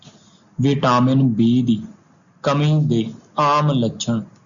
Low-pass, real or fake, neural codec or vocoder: 7.2 kHz; real; none